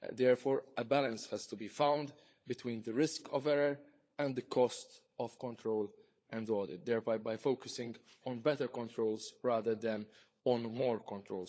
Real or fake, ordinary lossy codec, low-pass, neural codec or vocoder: fake; none; none; codec, 16 kHz, 16 kbps, FunCodec, trained on LibriTTS, 50 frames a second